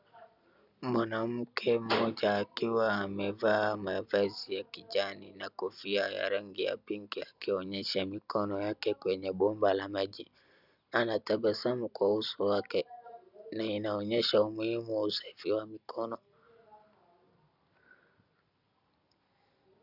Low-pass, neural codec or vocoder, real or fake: 5.4 kHz; none; real